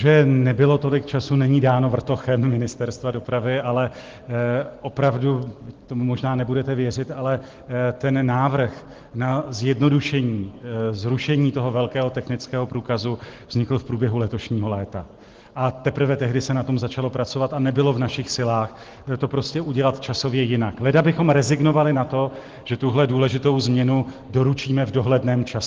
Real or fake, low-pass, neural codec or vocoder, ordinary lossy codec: real; 7.2 kHz; none; Opus, 16 kbps